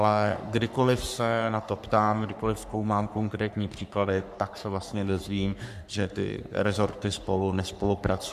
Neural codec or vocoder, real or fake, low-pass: codec, 44.1 kHz, 3.4 kbps, Pupu-Codec; fake; 14.4 kHz